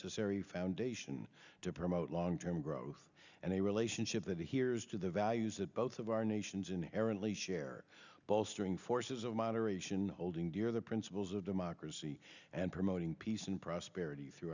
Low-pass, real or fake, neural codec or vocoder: 7.2 kHz; real; none